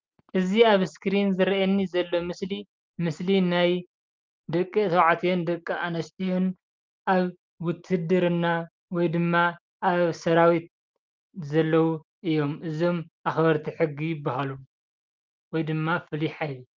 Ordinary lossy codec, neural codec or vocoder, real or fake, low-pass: Opus, 32 kbps; none; real; 7.2 kHz